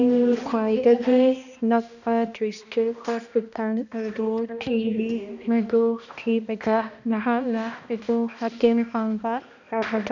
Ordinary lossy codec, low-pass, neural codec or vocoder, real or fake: none; 7.2 kHz; codec, 16 kHz, 1 kbps, X-Codec, HuBERT features, trained on balanced general audio; fake